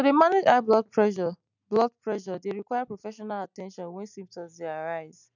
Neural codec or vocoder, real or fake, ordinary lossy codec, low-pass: none; real; none; 7.2 kHz